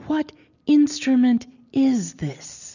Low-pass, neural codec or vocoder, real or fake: 7.2 kHz; none; real